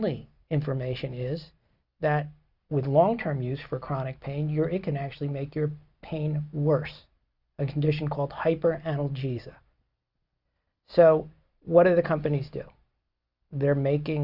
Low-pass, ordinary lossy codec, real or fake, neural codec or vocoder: 5.4 kHz; Opus, 64 kbps; real; none